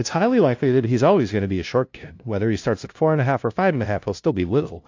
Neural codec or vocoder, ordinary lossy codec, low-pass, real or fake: codec, 16 kHz, 0.5 kbps, FunCodec, trained on LibriTTS, 25 frames a second; AAC, 48 kbps; 7.2 kHz; fake